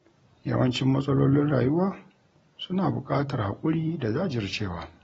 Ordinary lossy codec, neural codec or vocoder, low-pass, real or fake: AAC, 24 kbps; none; 19.8 kHz; real